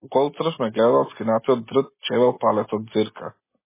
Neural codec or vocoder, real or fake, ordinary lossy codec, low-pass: none; real; MP3, 16 kbps; 3.6 kHz